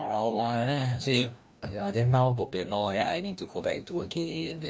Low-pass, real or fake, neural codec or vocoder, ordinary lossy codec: none; fake; codec, 16 kHz, 1 kbps, FreqCodec, larger model; none